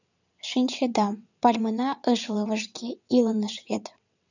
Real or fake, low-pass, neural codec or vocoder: fake; 7.2 kHz; vocoder, 22.05 kHz, 80 mel bands, WaveNeXt